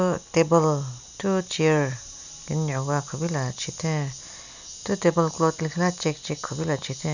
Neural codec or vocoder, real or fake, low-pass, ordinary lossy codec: none; real; 7.2 kHz; none